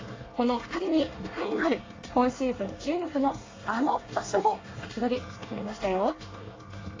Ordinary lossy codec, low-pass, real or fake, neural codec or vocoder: AAC, 32 kbps; 7.2 kHz; fake; codec, 24 kHz, 1 kbps, SNAC